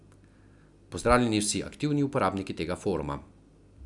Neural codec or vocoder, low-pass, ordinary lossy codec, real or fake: none; 10.8 kHz; none; real